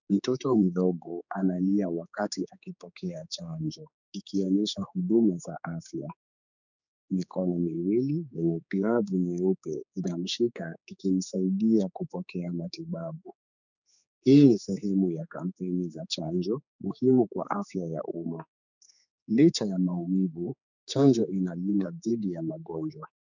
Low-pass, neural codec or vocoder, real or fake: 7.2 kHz; codec, 16 kHz, 4 kbps, X-Codec, HuBERT features, trained on general audio; fake